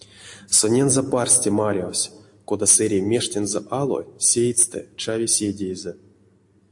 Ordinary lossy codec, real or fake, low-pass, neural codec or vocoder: AAC, 64 kbps; real; 10.8 kHz; none